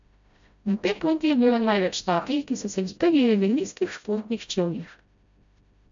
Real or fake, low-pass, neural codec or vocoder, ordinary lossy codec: fake; 7.2 kHz; codec, 16 kHz, 0.5 kbps, FreqCodec, smaller model; MP3, 64 kbps